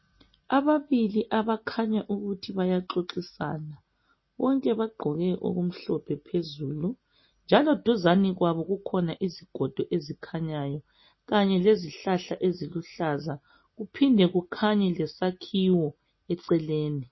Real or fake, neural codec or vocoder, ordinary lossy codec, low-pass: real; none; MP3, 24 kbps; 7.2 kHz